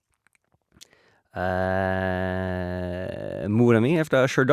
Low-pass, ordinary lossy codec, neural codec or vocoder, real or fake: 14.4 kHz; Opus, 64 kbps; none; real